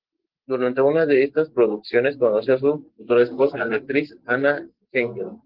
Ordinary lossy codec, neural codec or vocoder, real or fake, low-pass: Opus, 16 kbps; none; real; 5.4 kHz